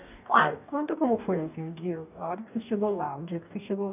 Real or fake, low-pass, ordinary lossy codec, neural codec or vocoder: fake; 3.6 kHz; none; codec, 44.1 kHz, 2.6 kbps, DAC